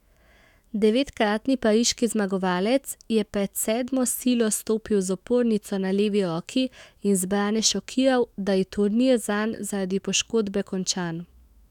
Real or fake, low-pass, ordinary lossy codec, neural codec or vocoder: fake; 19.8 kHz; none; autoencoder, 48 kHz, 128 numbers a frame, DAC-VAE, trained on Japanese speech